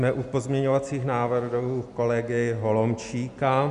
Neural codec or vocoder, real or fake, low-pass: none; real; 10.8 kHz